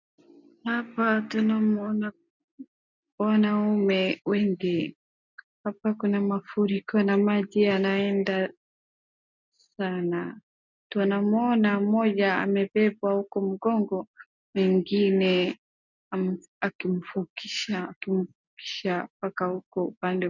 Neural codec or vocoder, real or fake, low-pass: none; real; 7.2 kHz